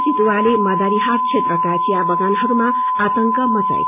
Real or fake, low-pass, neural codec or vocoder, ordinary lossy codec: real; 3.6 kHz; none; none